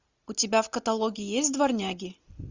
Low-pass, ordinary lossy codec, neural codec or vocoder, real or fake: 7.2 kHz; Opus, 64 kbps; none; real